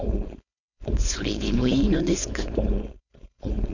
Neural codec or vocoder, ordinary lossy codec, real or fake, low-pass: codec, 16 kHz, 4.8 kbps, FACodec; none; fake; 7.2 kHz